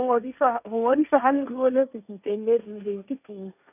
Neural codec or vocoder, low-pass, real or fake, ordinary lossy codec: codec, 16 kHz, 1.1 kbps, Voila-Tokenizer; 3.6 kHz; fake; none